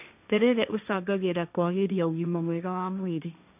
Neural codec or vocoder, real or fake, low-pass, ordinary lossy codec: codec, 16 kHz, 1.1 kbps, Voila-Tokenizer; fake; 3.6 kHz; none